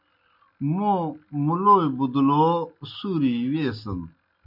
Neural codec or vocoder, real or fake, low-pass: none; real; 5.4 kHz